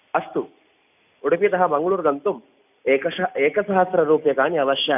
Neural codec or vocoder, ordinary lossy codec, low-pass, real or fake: none; none; 3.6 kHz; real